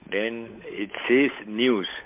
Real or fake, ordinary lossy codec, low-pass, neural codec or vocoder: fake; MP3, 24 kbps; 3.6 kHz; codec, 16 kHz, 8 kbps, FunCodec, trained on Chinese and English, 25 frames a second